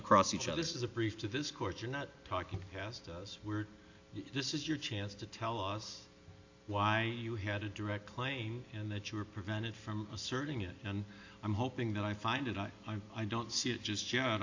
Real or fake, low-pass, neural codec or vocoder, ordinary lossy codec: real; 7.2 kHz; none; AAC, 48 kbps